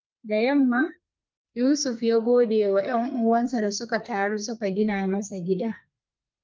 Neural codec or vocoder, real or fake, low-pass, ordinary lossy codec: codec, 32 kHz, 1.9 kbps, SNAC; fake; 7.2 kHz; Opus, 24 kbps